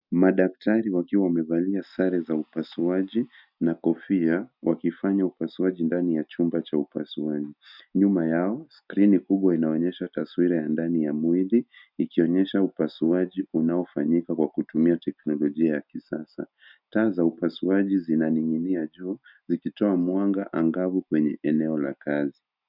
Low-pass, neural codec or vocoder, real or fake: 5.4 kHz; none; real